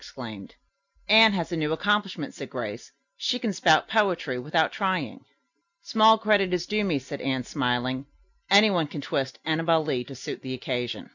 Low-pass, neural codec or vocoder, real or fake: 7.2 kHz; none; real